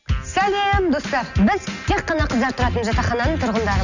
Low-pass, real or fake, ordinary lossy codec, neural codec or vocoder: 7.2 kHz; real; none; none